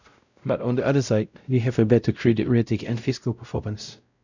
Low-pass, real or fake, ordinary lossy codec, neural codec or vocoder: 7.2 kHz; fake; none; codec, 16 kHz, 0.5 kbps, X-Codec, WavLM features, trained on Multilingual LibriSpeech